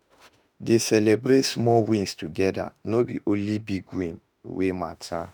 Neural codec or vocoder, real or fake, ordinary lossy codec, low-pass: autoencoder, 48 kHz, 32 numbers a frame, DAC-VAE, trained on Japanese speech; fake; none; none